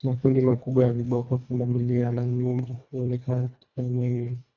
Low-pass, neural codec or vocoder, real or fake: 7.2 kHz; codec, 24 kHz, 1.5 kbps, HILCodec; fake